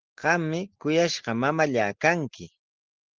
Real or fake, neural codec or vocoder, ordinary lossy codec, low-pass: real; none; Opus, 16 kbps; 7.2 kHz